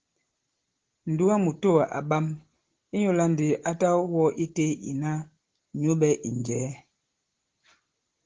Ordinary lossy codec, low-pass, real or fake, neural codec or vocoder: Opus, 16 kbps; 7.2 kHz; real; none